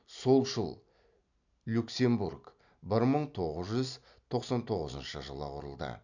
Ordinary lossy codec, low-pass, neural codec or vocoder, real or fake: none; 7.2 kHz; none; real